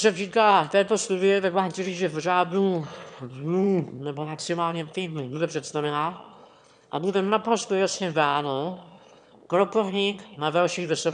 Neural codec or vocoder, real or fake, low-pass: autoencoder, 22.05 kHz, a latent of 192 numbers a frame, VITS, trained on one speaker; fake; 9.9 kHz